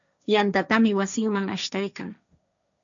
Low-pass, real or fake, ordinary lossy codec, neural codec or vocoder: 7.2 kHz; fake; MP3, 96 kbps; codec, 16 kHz, 1.1 kbps, Voila-Tokenizer